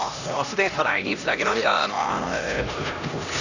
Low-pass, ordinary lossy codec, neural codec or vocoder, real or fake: 7.2 kHz; none; codec, 16 kHz, 1 kbps, X-Codec, HuBERT features, trained on LibriSpeech; fake